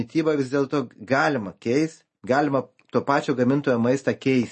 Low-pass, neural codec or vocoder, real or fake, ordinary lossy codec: 10.8 kHz; none; real; MP3, 32 kbps